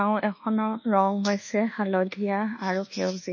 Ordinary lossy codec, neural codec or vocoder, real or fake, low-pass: MP3, 32 kbps; codec, 24 kHz, 1.2 kbps, DualCodec; fake; 7.2 kHz